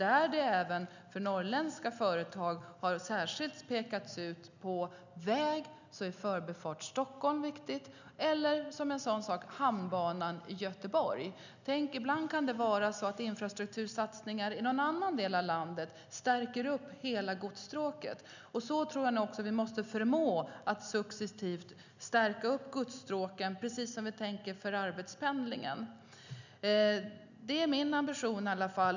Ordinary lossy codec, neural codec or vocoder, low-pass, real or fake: none; none; 7.2 kHz; real